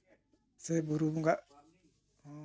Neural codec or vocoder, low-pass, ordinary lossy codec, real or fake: none; none; none; real